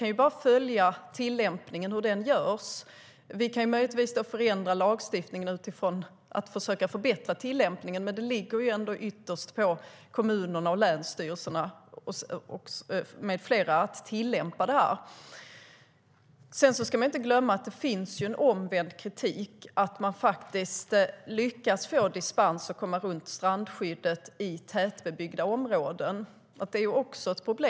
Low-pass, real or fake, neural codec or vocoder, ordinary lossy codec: none; real; none; none